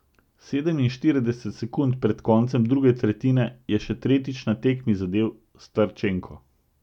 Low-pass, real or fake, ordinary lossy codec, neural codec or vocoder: 19.8 kHz; real; none; none